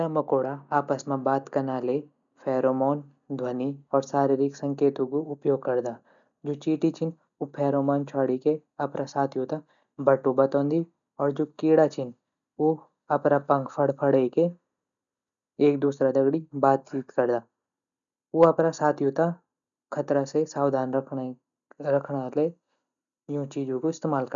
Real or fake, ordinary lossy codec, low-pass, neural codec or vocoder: real; none; 7.2 kHz; none